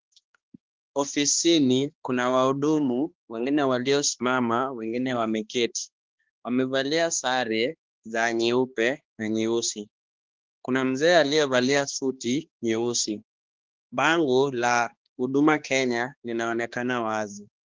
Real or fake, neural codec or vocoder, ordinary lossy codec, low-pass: fake; codec, 16 kHz, 2 kbps, X-Codec, HuBERT features, trained on balanced general audio; Opus, 16 kbps; 7.2 kHz